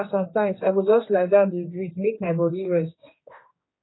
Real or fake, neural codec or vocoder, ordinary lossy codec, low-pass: fake; codec, 16 kHz, 2 kbps, X-Codec, HuBERT features, trained on general audio; AAC, 16 kbps; 7.2 kHz